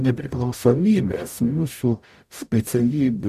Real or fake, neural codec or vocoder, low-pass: fake; codec, 44.1 kHz, 0.9 kbps, DAC; 14.4 kHz